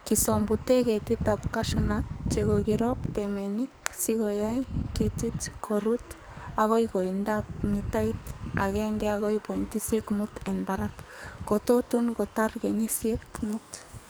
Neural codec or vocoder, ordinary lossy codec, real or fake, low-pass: codec, 44.1 kHz, 2.6 kbps, SNAC; none; fake; none